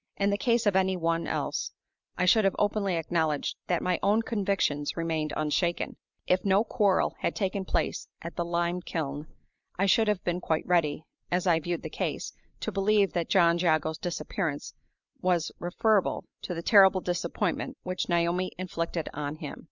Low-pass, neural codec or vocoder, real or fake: 7.2 kHz; none; real